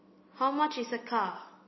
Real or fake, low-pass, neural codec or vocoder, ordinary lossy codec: real; 7.2 kHz; none; MP3, 24 kbps